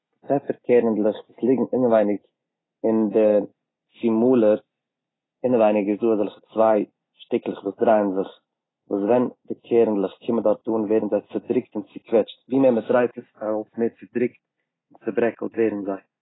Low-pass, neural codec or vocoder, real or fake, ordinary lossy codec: 7.2 kHz; none; real; AAC, 16 kbps